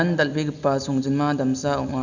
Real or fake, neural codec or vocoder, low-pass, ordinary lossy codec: real; none; 7.2 kHz; none